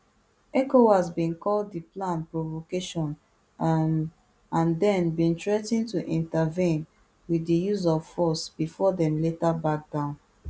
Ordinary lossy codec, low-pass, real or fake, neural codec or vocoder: none; none; real; none